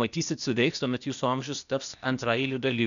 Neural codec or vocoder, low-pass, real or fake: codec, 16 kHz, 0.8 kbps, ZipCodec; 7.2 kHz; fake